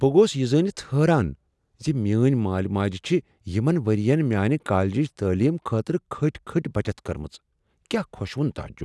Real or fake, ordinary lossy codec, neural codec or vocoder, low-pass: real; none; none; none